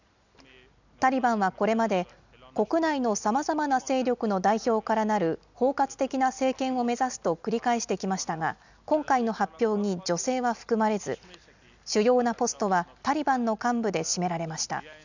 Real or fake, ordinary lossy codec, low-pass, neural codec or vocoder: real; none; 7.2 kHz; none